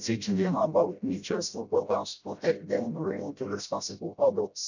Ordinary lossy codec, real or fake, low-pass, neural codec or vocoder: none; fake; 7.2 kHz; codec, 16 kHz, 0.5 kbps, FreqCodec, smaller model